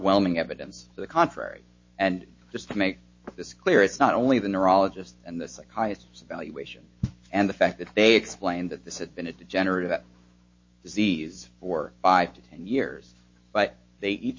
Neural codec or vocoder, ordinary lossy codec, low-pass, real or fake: autoencoder, 48 kHz, 128 numbers a frame, DAC-VAE, trained on Japanese speech; MP3, 32 kbps; 7.2 kHz; fake